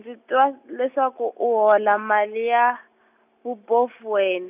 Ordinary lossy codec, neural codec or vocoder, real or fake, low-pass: none; none; real; 3.6 kHz